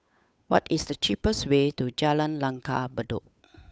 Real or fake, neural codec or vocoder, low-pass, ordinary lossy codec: fake; codec, 16 kHz, 8 kbps, FunCodec, trained on Chinese and English, 25 frames a second; none; none